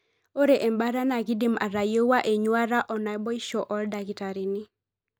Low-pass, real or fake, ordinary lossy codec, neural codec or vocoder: none; real; none; none